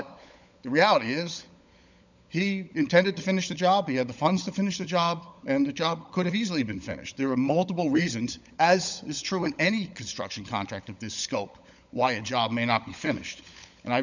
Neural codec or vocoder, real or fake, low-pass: codec, 16 kHz, 16 kbps, FunCodec, trained on LibriTTS, 50 frames a second; fake; 7.2 kHz